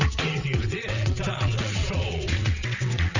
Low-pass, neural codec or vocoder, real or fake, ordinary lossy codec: 7.2 kHz; vocoder, 22.05 kHz, 80 mel bands, WaveNeXt; fake; none